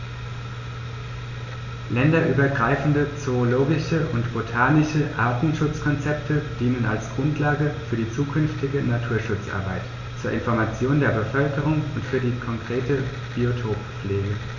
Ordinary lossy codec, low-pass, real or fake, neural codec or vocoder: none; 7.2 kHz; real; none